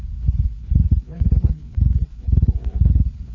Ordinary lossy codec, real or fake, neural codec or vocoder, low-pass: none; fake; codec, 16 kHz, 4 kbps, FreqCodec, larger model; 7.2 kHz